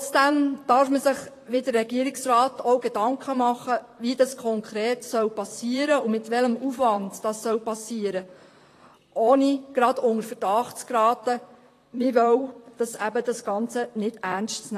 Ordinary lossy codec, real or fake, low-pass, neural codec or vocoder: AAC, 48 kbps; fake; 14.4 kHz; vocoder, 44.1 kHz, 128 mel bands, Pupu-Vocoder